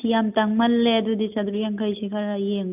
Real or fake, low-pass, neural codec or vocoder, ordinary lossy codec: real; 3.6 kHz; none; none